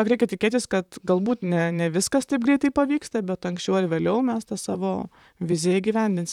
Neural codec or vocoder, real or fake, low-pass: vocoder, 44.1 kHz, 128 mel bands, Pupu-Vocoder; fake; 19.8 kHz